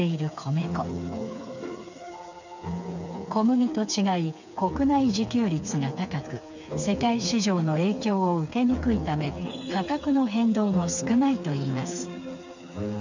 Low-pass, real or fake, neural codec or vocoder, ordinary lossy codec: 7.2 kHz; fake; codec, 16 kHz, 4 kbps, FreqCodec, smaller model; none